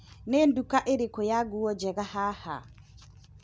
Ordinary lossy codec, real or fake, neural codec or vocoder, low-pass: none; real; none; none